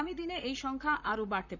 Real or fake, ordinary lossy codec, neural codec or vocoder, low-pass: fake; none; vocoder, 44.1 kHz, 128 mel bands, Pupu-Vocoder; 7.2 kHz